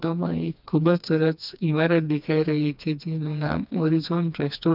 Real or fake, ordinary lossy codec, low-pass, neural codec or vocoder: fake; none; 5.4 kHz; codec, 16 kHz, 2 kbps, FreqCodec, smaller model